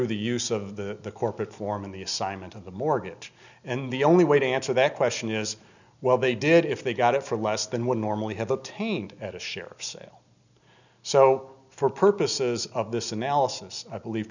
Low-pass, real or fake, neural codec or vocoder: 7.2 kHz; real; none